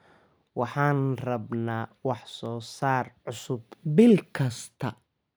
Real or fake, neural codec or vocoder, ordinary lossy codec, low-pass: real; none; none; none